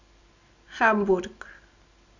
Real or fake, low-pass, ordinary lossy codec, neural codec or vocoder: real; 7.2 kHz; none; none